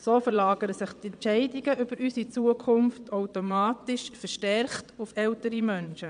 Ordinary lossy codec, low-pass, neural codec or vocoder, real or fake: MP3, 96 kbps; 9.9 kHz; vocoder, 22.05 kHz, 80 mel bands, Vocos; fake